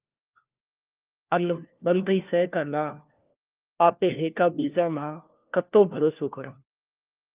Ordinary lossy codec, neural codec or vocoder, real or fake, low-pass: Opus, 32 kbps; codec, 16 kHz, 1 kbps, FunCodec, trained on LibriTTS, 50 frames a second; fake; 3.6 kHz